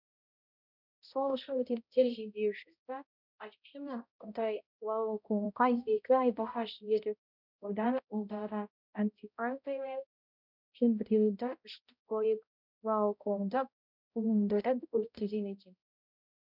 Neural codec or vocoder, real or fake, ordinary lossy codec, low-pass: codec, 16 kHz, 0.5 kbps, X-Codec, HuBERT features, trained on balanced general audio; fake; none; 5.4 kHz